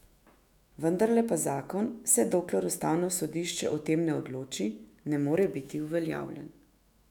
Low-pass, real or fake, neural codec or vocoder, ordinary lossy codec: 19.8 kHz; fake; autoencoder, 48 kHz, 128 numbers a frame, DAC-VAE, trained on Japanese speech; none